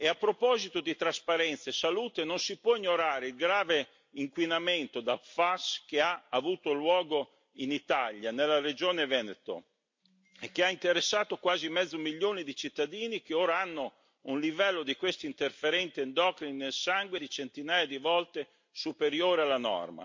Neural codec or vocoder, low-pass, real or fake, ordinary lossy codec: none; 7.2 kHz; real; MP3, 48 kbps